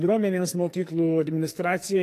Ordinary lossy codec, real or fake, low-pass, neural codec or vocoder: AAC, 64 kbps; fake; 14.4 kHz; codec, 44.1 kHz, 2.6 kbps, SNAC